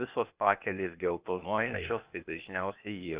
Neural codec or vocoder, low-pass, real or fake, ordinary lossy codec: codec, 16 kHz, 0.8 kbps, ZipCodec; 3.6 kHz; fake; Opus, 64 kbps